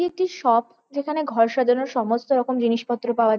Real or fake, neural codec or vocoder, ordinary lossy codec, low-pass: real; none; none; none